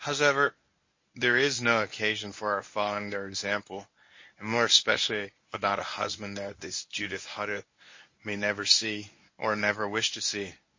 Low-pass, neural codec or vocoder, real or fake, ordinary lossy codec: 7.2 kHz; codec, 24 kHz, 0.9 kbps, WavTokenizer, medium speech release version 1; fake; MP3, 32 kbps